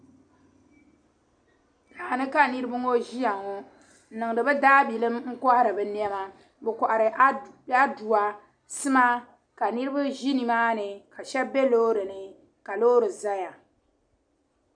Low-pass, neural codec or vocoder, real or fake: 9.9 kHz; none; real